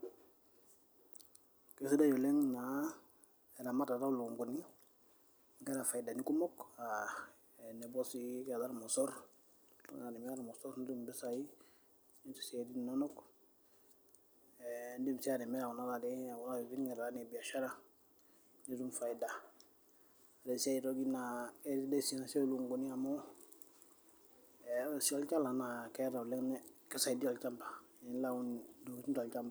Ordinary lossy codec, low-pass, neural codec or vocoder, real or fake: none; none; none; real